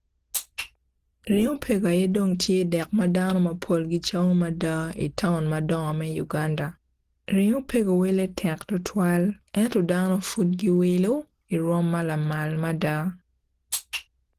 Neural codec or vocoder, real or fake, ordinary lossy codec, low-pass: none; real; Opus, 16 kbps; 14.4 kHz